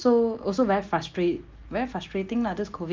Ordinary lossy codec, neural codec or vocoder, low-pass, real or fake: Opus, 32 kbps; none; 7.2 kHz; real